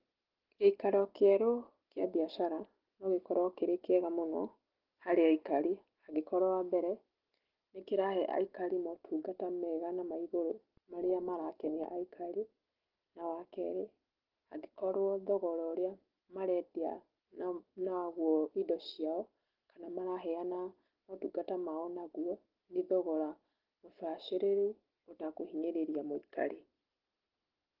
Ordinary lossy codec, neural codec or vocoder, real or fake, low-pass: Opus, 16 kbps; none; real; 5.4 kHz